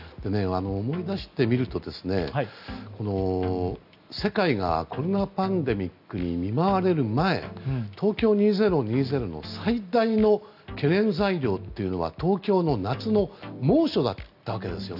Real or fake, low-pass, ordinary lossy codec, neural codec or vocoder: real; 5.4 kHz; none; none